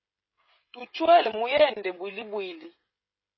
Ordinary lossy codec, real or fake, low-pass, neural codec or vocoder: MP3, 24 kbps; fake; 7.2 kHz; codec, 16 kHz, 16 kbps, FreqCodec, smaller model